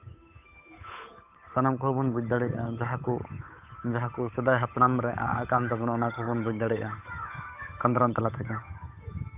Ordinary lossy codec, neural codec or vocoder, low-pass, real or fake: none; codec, 16 kHz, 8 kbps, FunCodec, trained on Chinese and English, 25 frames a second; 3.6 kHz; fake